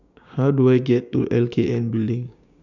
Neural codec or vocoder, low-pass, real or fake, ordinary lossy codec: codec, 16 kHz, 6 kbps, DAC; 7.2 kHz; fake; none